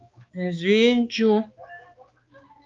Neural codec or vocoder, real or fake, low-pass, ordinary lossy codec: codec, 16 kHz, 2 kbps, X-Codec, HuBERT features, trained on balanced general audio; fake; 7.2 kHz; Opus, 32 kbps